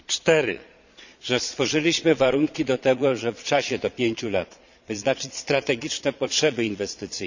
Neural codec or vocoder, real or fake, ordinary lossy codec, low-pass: vocoder, 22.05 kHz, 80 mel bands, Vocos; fake; none; 7.2 kHz